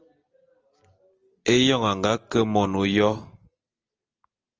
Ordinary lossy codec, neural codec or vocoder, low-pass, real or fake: Opus, 24 kbps; none; 7.2 kHz; real